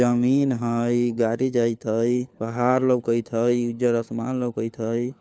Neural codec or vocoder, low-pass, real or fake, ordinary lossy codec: codec, 16 kHz, 4 kbps, FunCodec, trained on LibriTTS, 50 frames a second; none; fake; none